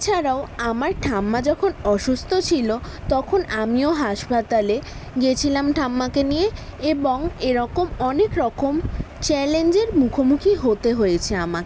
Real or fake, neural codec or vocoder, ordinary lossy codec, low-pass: real; none; none; none